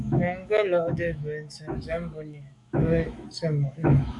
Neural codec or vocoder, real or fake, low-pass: autoencoder, 48 kHz, 128 numbers a frame, DAC-VAE, trained on Japanese speech; fake; 10.8 kHz